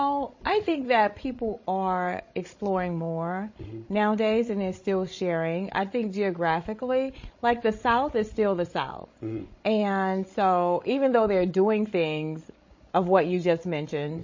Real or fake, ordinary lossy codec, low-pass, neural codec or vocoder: fake; MP3, 32 kbps; 7.2 kHz; codec, 16 kHz, 16 kbps, FreqCodec, larger model